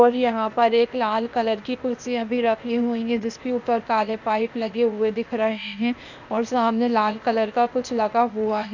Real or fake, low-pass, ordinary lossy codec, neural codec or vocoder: fake; 7.2 kHz; none; codec, 16 kHz, 0.8 kbps, ZipCodec